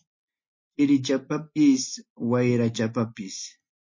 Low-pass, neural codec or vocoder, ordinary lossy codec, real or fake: 7.2 kHz; none; MP3, 32 kbps; real